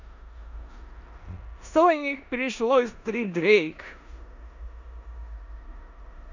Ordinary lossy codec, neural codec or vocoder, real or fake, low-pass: none; codec, 16 kHz in and 24 kHz out, 0.9 kbps, LongCat-Audio-Codec, four codebook decoder; fake; 7.2 kHz